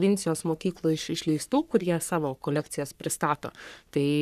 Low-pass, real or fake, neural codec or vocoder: 14.4 kHz; fake; codec, 44.1 kHz, 3.4 kbps, Pupu-Codec